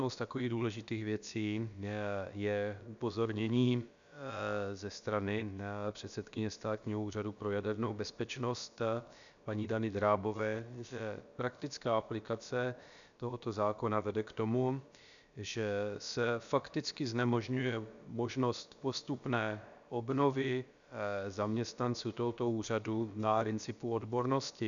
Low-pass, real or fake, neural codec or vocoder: 7.2 kHz; fake; codec, 16 kHz, about 1 kbps, DyCAST, with the encoder's durations